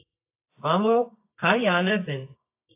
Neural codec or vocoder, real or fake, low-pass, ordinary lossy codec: codec, 24 kHz, 0.9 kbps, WavTokenizer, medium music audio release; fake; 3.6 kHz; AAC, 24 kbps